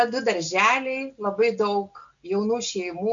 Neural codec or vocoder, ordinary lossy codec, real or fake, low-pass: none; MP3, 64 kbps; real; 7.2 kHz